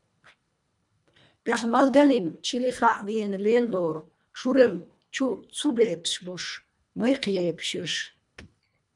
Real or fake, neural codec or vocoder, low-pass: fake; codec, 24 kHz, 1.5 kbps, HILCodec; 10.8 kHz